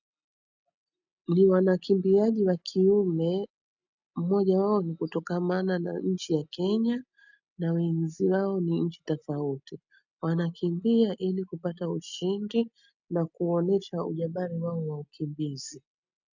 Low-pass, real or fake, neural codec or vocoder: 7.2 kHz; real; none